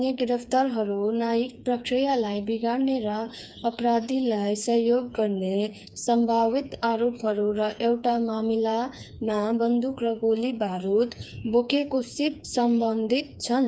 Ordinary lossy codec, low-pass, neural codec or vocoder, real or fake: none; none; codec, 16 kHz, 4 kbps, FreqCodec, smaller model; fake